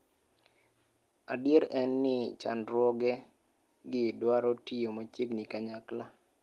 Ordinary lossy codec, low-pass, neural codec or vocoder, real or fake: Opus, 24 kbps; 14.4 kHz; none; real